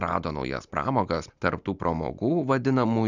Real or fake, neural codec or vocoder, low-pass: real; none; 7.2 kHz